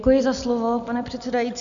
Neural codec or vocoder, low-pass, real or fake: none; 7.2 kHz; real